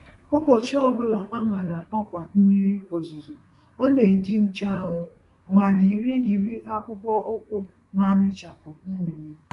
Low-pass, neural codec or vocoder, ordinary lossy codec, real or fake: 10.8 kHz; codec, 24 kHz, 1 kbps, SNAC; none; fake